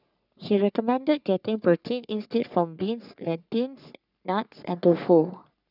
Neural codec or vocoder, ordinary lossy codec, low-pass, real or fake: codec, 44.1 kHz, 3.4 kbps, Pupu-Codec; none; 5.4 kHz; fake